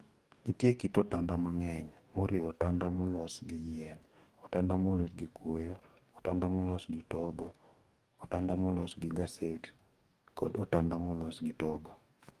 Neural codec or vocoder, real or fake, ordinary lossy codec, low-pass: codec, 44.1 kHz, 2.6 kbps, DAC; fake; Opus, 32 kbps; 14.4 kHz